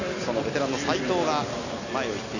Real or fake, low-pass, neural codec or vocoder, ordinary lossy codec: real; 7.2 kHz; none; none